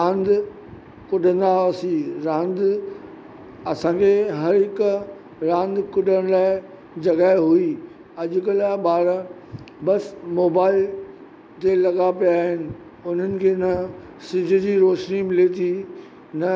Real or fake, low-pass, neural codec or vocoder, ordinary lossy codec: real; none; none; none